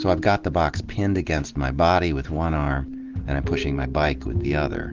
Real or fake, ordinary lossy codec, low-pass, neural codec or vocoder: real; Opus, 16 kbps; 7.2 kHz; none